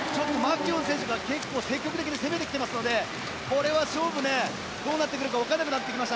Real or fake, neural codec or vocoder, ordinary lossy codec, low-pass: real; none; none; none